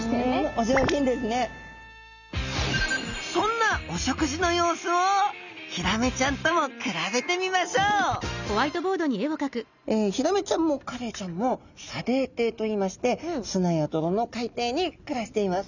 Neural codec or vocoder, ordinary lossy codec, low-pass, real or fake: none; none; 7.2 kHz; real